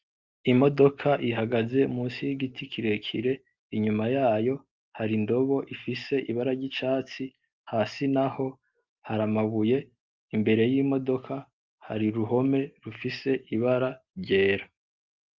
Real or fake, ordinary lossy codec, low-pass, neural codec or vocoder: real; Opus, 32 kbps; 7.2 kHz; none